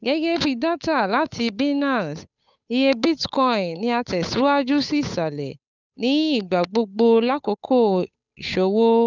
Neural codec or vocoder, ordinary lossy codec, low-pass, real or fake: codec, 16 kHz, 8 kbps, FunCodec, trained on Chinese and English, 25 frames a second; none; 7.2 kHz; fake